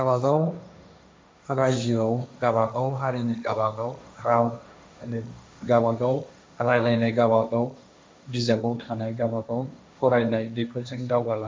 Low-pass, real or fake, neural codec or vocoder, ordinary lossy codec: none; fake; codec, 16 kHz, 1.1 kbps, Voila-Tokenizer; none